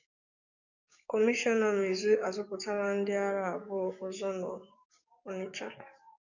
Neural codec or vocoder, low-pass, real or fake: codec, 44.1 kHz, 7.8 kbps, DAC; 7.2 kHz; fake